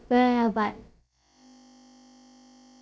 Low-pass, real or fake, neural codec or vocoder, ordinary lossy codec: none; fake; codec, 16 kHz, about 1 kbps, DyCAST, with the encoder's durations; none